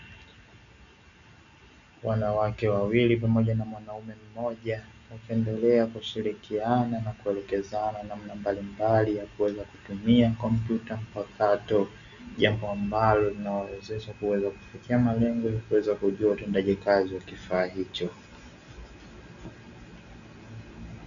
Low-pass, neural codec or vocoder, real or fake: 7.2 kHz; none; real